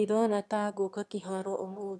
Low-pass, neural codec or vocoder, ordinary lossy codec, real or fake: none; autoencoder, 22.05 kHz, a latent of 192 numbers a frame, VITS, trained on one speaker; none; fake